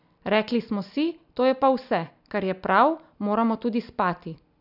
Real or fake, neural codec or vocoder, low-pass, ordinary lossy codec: real; none; 5.4 kHz; none